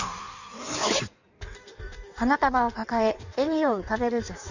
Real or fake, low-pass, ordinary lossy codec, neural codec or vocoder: fake; 7.2 kHz; none; codec, 16 kHz in and 24 kHz out, 1.1 kbps, FireRedTTS-2 codec